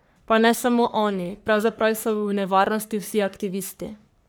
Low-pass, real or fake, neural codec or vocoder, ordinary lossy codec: none; fake; codec, 44.1 kHz, 3.4 kbps, Pupu-Codec; none